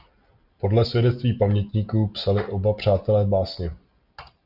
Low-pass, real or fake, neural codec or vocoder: 5.4 kHz; real; none